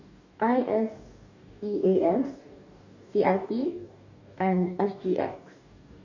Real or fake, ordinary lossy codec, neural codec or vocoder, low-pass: fake; none; codec, 44.1 kHz, 2.6 kbps, DAC; 7.2 kHz